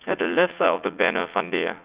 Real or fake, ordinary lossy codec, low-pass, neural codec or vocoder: fake; Opus, 64 kbps; 3.6 kHz; vocoder, 44.1 kHz, 80 mel bands, Vocos